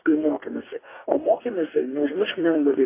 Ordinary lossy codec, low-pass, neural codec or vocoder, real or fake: AAC, 24 kbps; 3.6 kHz; codec, 44.1 kHz, 2.6 kbps, DAC; fake